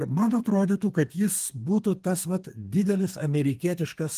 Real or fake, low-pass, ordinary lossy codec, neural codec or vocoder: fake; 14.4 kHz; Opus, 24 kbps; codec, 32 kHz, 1.9 kbps, SNAC